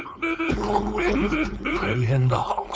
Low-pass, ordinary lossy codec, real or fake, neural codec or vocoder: none; none; fake; codec, 16 kHz, 4.8 kbps, FACodec